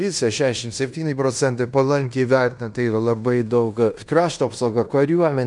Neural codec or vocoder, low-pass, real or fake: codec, 16 kHz in and 24 kHz out, 0.9 kbps, LongCat-Audio-Codec, fine tuned four codebook decoder; 10.8 kHz; fake